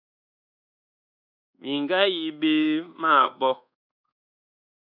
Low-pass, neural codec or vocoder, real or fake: 5.4 kHz; codec, 24 kHz, 1.2 kbps, DualCodec; fake